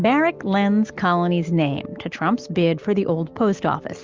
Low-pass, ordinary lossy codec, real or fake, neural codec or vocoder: 7.2 kHz; Opus, 24 kbps; real; none